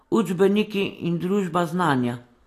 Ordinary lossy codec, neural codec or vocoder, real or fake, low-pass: AAC, 48 kbps; none; real; 14.4 kHz